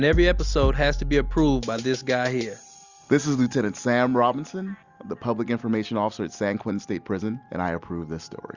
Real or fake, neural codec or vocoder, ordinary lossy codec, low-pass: real; none; Opus, 64 kbps; 7.2 kHz